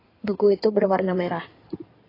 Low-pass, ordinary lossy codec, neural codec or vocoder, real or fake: 5.4 kHz; AAC, 24 kbps; codec, 16 kHz in and 24 kHz out, 2.2 kbps, FireRedTTS-2 codec; fake